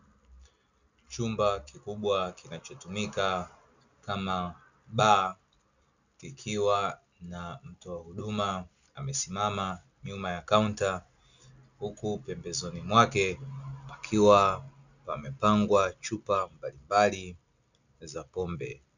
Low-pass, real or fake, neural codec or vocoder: 7.2 kHz; real; none